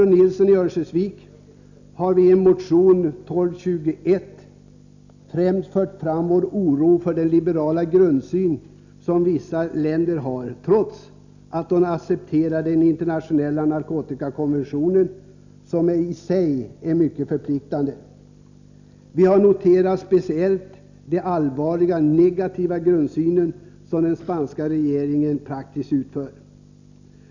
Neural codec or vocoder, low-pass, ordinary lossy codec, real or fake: none; 7.2 kHz; none; real